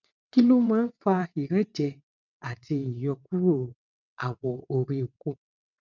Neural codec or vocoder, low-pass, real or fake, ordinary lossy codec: vocoder, 22.05 kHz, 80 mel bands, WaveNeXt; 7.2 kHz; fake; none